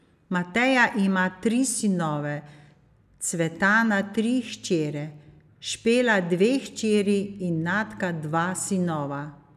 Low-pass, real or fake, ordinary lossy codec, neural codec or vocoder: 14.4 kHz; real; none; none